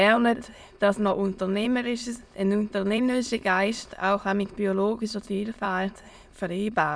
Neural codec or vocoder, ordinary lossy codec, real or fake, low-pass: autoencoder, 22.05 kHz, a latent of 192 numbers a frame, VITS, trained on many speakers; none; fake; none